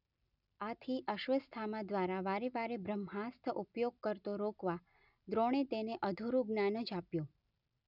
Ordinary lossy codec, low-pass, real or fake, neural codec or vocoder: none; 5.4 kHz; real; none